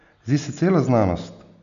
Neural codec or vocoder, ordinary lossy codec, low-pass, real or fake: none; none; 7.2 kHz; real